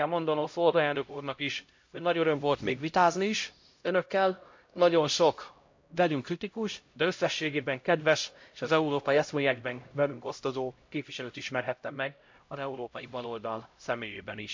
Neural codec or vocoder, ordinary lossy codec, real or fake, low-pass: codec, 16 kHz, 0.5 kbps, X-Codec, HuBERT features, trained on LibriSpeech; MP3, 48 kbps; fake; 7.2 kHz